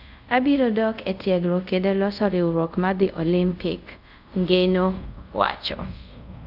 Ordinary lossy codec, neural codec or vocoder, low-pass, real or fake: none; codec, 24 kHz, 0.5 kbps, DualCodec; 5.4 kHz; fake